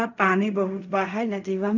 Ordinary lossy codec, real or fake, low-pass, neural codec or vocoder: none; fake; 7.2 kHz; codec, 16 kHz in and 24 kHz out, 0.4 kbps, LongCat-Audio-Codec, fine tuned four codebook decoder